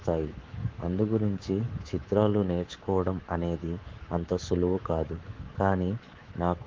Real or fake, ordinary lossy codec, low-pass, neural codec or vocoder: fake; Opus, 16 kbps; 7.2 kHz; codec, 24 kHz, 3.1 kbps, DualCodec